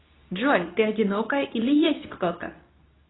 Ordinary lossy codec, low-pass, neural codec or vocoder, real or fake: AAC, 16 kbps; 7.2 kHz; codec, 24 kHz, 0.9 kbps, WavTokenizer, medium speech release version 2; fake